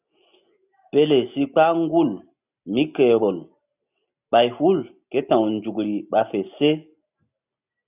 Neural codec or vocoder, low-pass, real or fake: none; 3.6 kHz; real